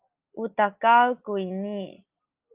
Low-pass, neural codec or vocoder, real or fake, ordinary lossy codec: 3.6 kHz; none; real; Opus, 24 kbps